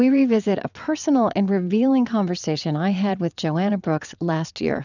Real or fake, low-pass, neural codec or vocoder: fake; 7.2 kHz; vocoder, 22.05 kHz, 80 mel bands, WaveNeXt